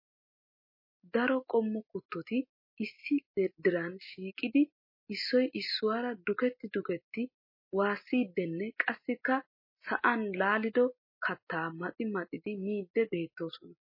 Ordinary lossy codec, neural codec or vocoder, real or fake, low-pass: MP3, 24 kbps; none; real; 5.4 kHz